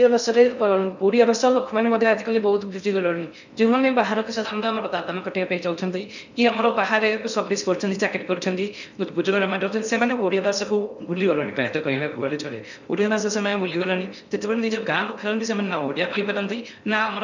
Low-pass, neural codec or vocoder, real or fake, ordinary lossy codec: 7.2 kHz; codec, 16 kHz in and 24 kHz out, 0.6 kbps, FocalCodec, streaming, 2048 codes; fake; none